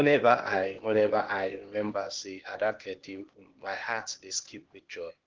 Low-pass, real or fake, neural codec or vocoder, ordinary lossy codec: 7.2 kHz; fake; codec, 16 kHz, 0.8 kbps, ZipCodec; Opus, 16 kbps